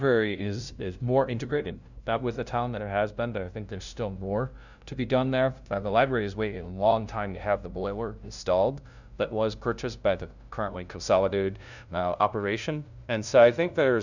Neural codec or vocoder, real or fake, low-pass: codec, 16 kHz, 0.5 kbps, FunCodec, trained on LibriTTS, 25 frames a second; fake; 7.2 kHz